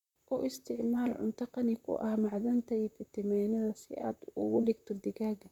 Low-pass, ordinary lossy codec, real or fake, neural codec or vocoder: 19.8 kHz; none; fake; vocoder, 44.1 kHz, 128 mel bands, Pupu-Vocoder